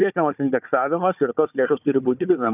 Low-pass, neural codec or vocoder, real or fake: 3.6 kHz; codec, 16 kHz, 4 kbps, FunCodec, trained on Chinese and English, 50 frames a second; fake